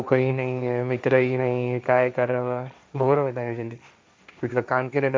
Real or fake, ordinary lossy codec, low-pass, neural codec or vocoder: fake; none; none; codec, 16 kHz, 1.1 kbps, Voila-Tokenizer